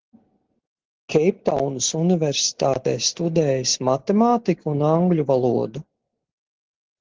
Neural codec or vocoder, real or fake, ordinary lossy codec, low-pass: none; real; Opus, 16 kbps; 7.2 kHz